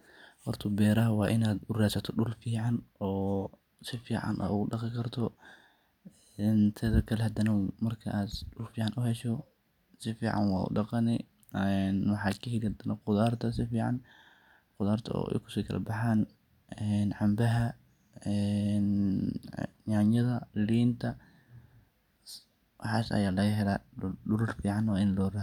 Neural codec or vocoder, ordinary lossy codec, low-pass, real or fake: none; none; 19.8 kHz; real